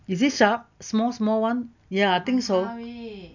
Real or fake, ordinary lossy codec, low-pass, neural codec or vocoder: real; none; 7.2 kHz; none